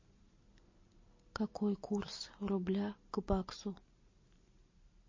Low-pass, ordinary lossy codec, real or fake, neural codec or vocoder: 7.2 kHz; MP3, 32 kbps; real; none